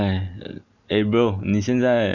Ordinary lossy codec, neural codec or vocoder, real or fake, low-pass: none; none; real; 7.2 kHz